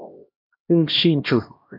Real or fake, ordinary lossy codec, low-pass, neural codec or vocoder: fake; AAC, 48 kbps; 5.4 kHz; codec, 16 kHz, 1 kbps, X-Codec, HuBERT features, trained on LibriSpeech